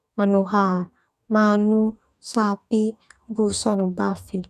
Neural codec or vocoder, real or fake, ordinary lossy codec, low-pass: codec, 44.1 kHz, 2.6 kbps, DAC; fake; none; 14.4 kHz